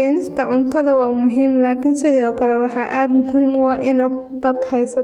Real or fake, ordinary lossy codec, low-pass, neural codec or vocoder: fake; none; 19.8 kHz; codec, 44.1 kHz, 2.6 kbps, DAC